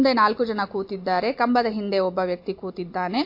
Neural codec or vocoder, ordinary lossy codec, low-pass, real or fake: none; MP3, 32 kbps; 5.4 kHz; real